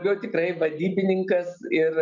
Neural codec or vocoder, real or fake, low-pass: none; real; 7.2 kHz